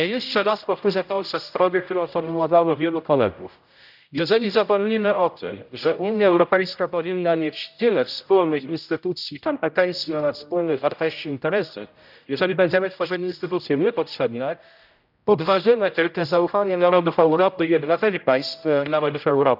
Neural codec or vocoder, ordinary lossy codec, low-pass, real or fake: codec, 16 kHz, 0.5 kbps, X-Codec, HuBERT features, trained on general audio; none; 5.4 kHz; fake